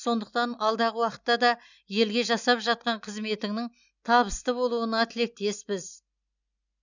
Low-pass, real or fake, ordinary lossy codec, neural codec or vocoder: 7.2 kHz; real; none; none